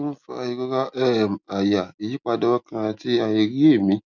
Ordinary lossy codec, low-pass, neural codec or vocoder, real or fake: none; 7.2 kHz; none; real